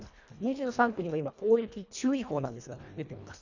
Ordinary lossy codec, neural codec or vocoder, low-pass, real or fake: none; codec, 24 kHz, 1.5 kbps, HILCodec; 7.2 kHz; fake